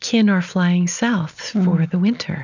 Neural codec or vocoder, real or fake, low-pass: none; real; 7.2 kHz